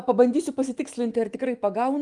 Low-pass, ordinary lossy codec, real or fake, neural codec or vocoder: 10.8 kHz; Opus, 24 kbps; fake; autoencoder, 48 kHz, 128 numbers a frame, DAC-VAE, trained on Japanese speech